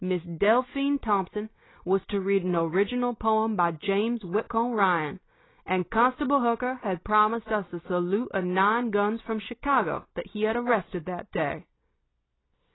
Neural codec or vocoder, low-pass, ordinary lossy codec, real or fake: none; 7.2 kHz; AAC, 16 kbps; real